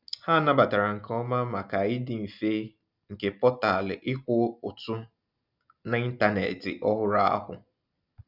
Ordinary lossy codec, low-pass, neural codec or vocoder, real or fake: none; 5.4 kHz; none; real